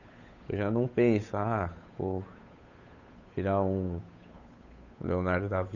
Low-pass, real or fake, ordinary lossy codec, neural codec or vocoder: 7.2 kHz; fake; none; codec, 16 kHz, 8 kbps, FunCodec, trained on Chinese and English, 25 frames a second